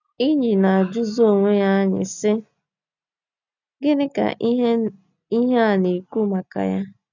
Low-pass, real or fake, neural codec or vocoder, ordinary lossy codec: 7.2 kHz; real; none; none